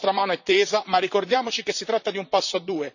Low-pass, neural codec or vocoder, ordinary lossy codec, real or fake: 7.2 kHz; vocoder, 44.1 kHz, 128 mel bands, Pupu-Vocoder; none; fake